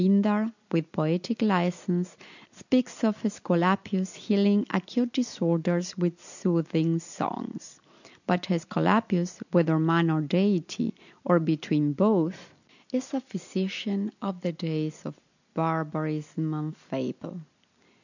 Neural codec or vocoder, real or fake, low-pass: none; real; 7.2 kHz